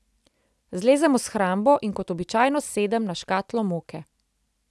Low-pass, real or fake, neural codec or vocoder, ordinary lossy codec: none; real; none; none